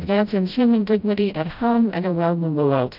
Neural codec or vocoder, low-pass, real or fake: codec, 16 kHz, 0.5 kbps, FreqCodec, smaller model; 5.4 kHz; fake